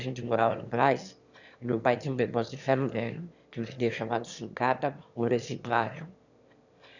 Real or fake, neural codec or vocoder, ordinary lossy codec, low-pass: fake; autoencoder, 22.05 kHz, a latent of 192 numbers a frame, VITS, trained on one speaker; none; 7.2 kHz